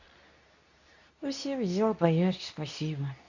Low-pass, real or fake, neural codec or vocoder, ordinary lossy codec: 7.2 kHz; fake; codec, 24 kHz, 0.9 kbps, WavTokenizer, medium speech release version 2; none